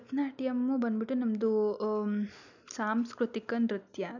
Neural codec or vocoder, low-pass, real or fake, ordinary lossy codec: none; 7.2 kHz; real; none